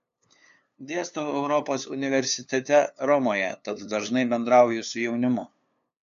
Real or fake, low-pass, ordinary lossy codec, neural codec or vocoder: fake; 7.2 kHz; AAC, 96 kbps; codec, 16 kHz, 2 kbps, FunCodec, trained on LibriTTS, 25 frames a second